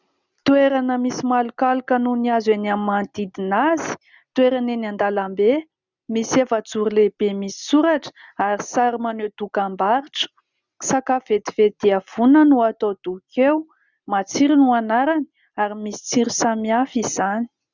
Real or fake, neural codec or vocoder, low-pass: real; none; 7.2 kHz